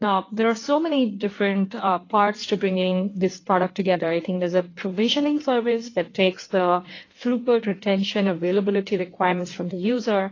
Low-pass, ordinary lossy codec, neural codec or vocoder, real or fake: 7.2 kHz; AAC, 32 kbps; codec, 16 kHz in and 24 kHz out, 1.1 kbps, FireRedTTS-2 codec; fake